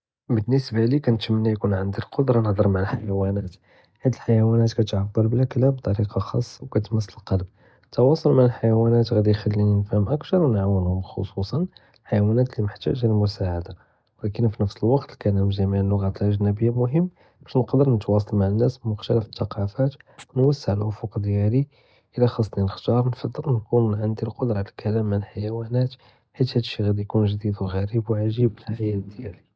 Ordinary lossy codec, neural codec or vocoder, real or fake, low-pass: none; none; real; none